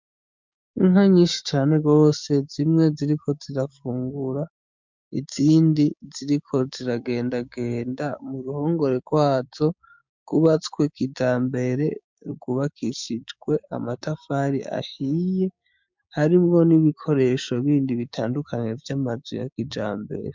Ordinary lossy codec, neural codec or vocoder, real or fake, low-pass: MP3, 64 kbps; codec, 16 kHz, 6 kbps, DAC; fake; 7.2 kHz